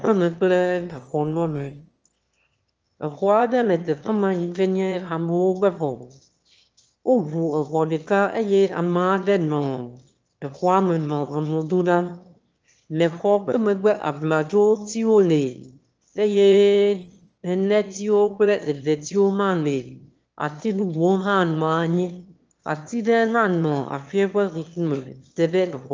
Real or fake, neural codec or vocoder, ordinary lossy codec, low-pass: fake; autoencoder, 22.05 kHz, a latent of 192 numbers a frame, VITS, trained on one speaker; Opus, 24 kbps; 7.2 kHz